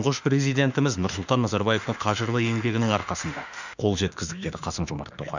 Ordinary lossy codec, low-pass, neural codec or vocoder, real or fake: none; 7.2 kHz; autoencoder, 48 kHz, 32 numbers a frame, DAC-VAE, trained on Japanese speech; fake